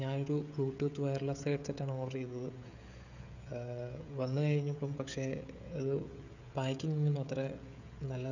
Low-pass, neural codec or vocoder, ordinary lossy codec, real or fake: 7.2 kHz; codec, 16 kHz, 16 kbps, FreqCodec, smaller model; none; fake